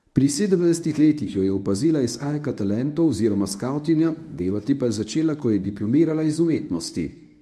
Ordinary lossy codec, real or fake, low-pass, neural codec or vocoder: none; fake; none; codec, 24 kHz, 0.9 kbps, WavTokenizer, medium speech release version 1